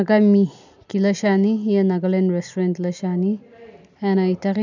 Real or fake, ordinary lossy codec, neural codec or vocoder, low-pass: real; none; none; 7.2 kHz